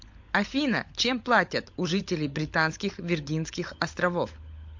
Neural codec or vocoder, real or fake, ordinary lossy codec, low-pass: codec, 16 kHz, 16 kbps, FunCodec, trained on LibriTTS, 50 frames a second; fake; MP3, 48 kbps; 7.2 kHz